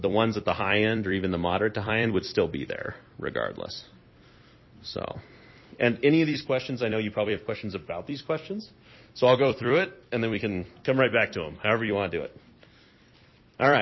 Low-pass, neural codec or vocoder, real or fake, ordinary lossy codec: 7.2 kHz; vocoder, 44.1 kHz, 128 mel bands every 256 samples, BigVGAN v2; fake; MP3, 24 kbps